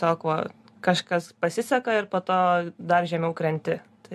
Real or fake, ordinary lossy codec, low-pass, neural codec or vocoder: real; MP3, 64 kbps; 14.4 kHz; none